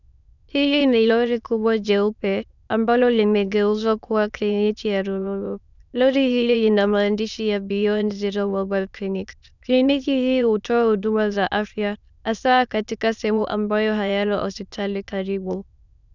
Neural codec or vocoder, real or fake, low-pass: autoencoder, 22.05 kHz, a latent of 192 numbers a frame, VITS, trained on many speakers; fake; 7.2 kHz